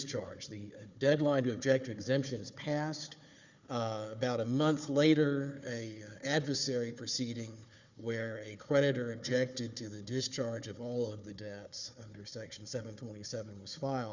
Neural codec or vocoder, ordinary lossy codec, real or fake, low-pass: codec, 16 kHz, 4 kbps, FunCodec, trained on Chinese and English, 50 frames a second; Opus, 64 kbps; fake; 7.2 kHz